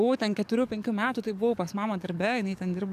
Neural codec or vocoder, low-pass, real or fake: codec, 44.1 kHz, 7.8 kbps, DAC; 14.4 kHz; fake